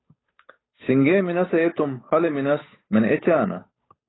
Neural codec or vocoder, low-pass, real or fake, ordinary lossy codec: codec, 16 kHz, 8 kbps, FunCodec, trained on Chinese and English, 25 frames a second; 7.2 kHz; fake; AAC, 16 kbps